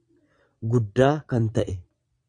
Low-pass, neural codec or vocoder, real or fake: 9.9 kHz; vocoder, 22.05 kHz, 80 mel bands, Vocos; fake